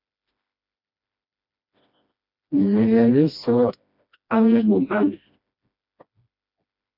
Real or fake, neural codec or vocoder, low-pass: fake; codec, 16 kHz, 1 kbps, FreqCodec, smaller model; 5.4 kHz